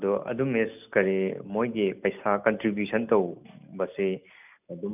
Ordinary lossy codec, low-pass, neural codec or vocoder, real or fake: none; 3.6 kHz; none; real